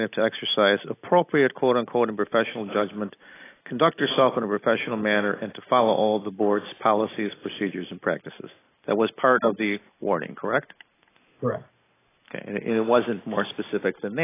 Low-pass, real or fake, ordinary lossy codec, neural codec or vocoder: 3.6 kHz; real; AAC, 16 kbps; none